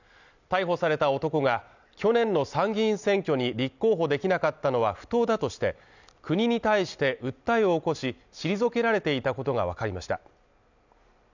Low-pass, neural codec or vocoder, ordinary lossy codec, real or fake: 7.2 kHz; none; none; real